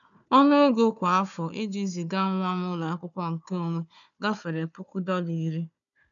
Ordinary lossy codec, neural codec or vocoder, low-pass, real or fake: none; codec, 16 kHz, 4 kbps, FunCodec, trained on Chinese and English, 50 frames a second; 7.2 kHz; fake